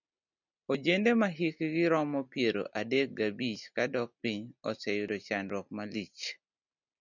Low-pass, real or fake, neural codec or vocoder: 7.2 kHz; real; none